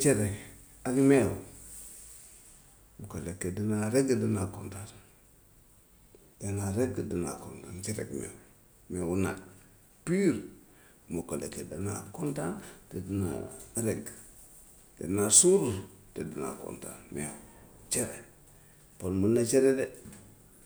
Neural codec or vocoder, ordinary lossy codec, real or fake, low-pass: none; none; real; none